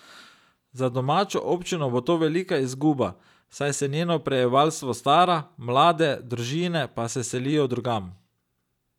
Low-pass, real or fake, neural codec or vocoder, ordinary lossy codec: 19.8 kHz; real; none; none